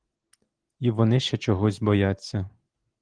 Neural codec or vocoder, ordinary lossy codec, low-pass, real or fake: none; Opus, 16 kbps; 9.9 kHz; real